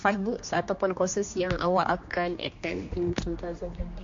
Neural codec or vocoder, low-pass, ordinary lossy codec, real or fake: codec, 16 kHz, 2 kbps, X-Codec, HuBERT features, trained on general audio; 7.2 kHz; MP3, 48 kbps; fake